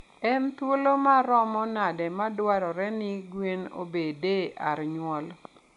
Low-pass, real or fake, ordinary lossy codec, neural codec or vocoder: 10.8 kHz; real; none; none